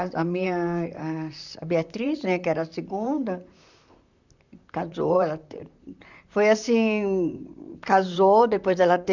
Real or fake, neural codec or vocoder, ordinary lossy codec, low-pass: fake; vocoder, 44.1 kHz, 128 mel bands, Pupu-Vocoder; none; 7.2 kHz